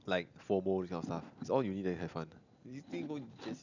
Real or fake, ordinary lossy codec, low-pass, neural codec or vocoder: real; none; 7.2 kHz; none